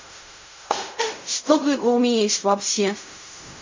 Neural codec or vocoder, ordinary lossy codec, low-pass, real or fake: codec, 16 kHz in and 24 kHz out, 0.4 kbps, LongCat-Audio-Codec, fine tuned four codebook decoder; MP3, 64 kbps; 7.2 kHz; fake